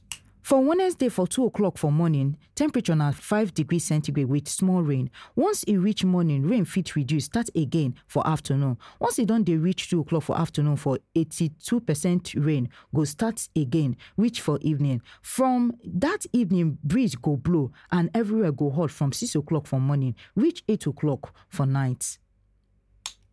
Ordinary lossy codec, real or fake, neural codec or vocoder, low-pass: none; real; none; none